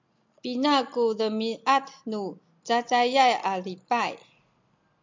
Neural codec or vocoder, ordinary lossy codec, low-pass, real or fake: none; MP3, 64 kbps; 7.2 kHz; real